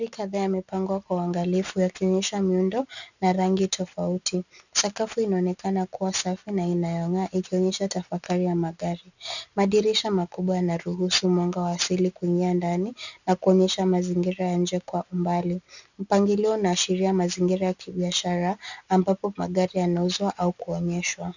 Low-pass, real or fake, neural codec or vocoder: 7.2 kHz; real; none